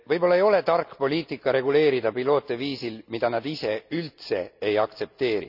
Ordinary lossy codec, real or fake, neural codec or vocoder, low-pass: none; real; none; 5.4 kHz